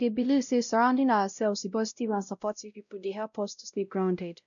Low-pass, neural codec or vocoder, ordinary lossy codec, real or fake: 7.2 kHz; codec, 16 kHz, 0.5 kbps, X-Codec, WavLM features, trained on Multilingual LibriSpeech; none; fake